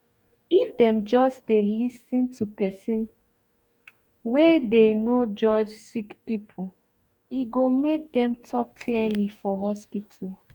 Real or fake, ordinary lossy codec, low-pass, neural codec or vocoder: fake; none; 19.8 kHz; codec, 44.1 kHz, 2.6 kbps, DAC